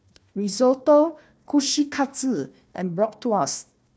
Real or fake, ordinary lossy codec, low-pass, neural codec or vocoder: fake; none; none; codec, 16 kHz, 1 kbps, FunCodec, trained on Chinese and English, 50 frames a second